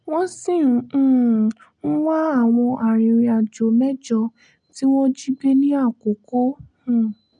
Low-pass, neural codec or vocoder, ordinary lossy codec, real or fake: 9.9 kHz; none; none; real